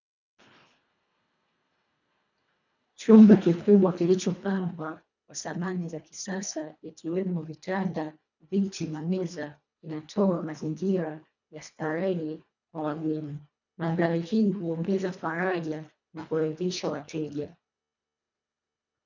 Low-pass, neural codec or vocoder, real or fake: 7.2 kHz; codec, 24 kHz, 1.5 kbps, HILCodec; fake